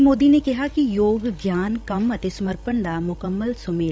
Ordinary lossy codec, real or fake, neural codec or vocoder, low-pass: none; fake; codec, 16 kHz, 16 kbps, FreqCodec, larger model; none